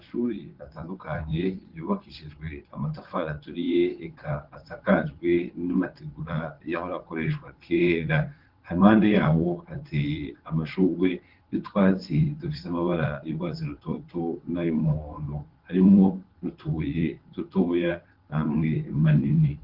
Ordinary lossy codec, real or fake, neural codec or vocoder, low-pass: Opus, 16 kbps; fake; vocoder, 22.05 kHz, 80 mel bands, WaveNeXt; 5.4 kHz